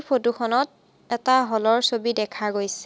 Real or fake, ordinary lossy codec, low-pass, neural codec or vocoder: real; none; none; none